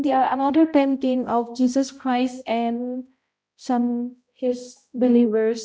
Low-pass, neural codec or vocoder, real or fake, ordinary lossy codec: none; codec, 16 kHz, 0.5 kbps, X-Codec, HuBERT features, trained on balanced general audio; fake; none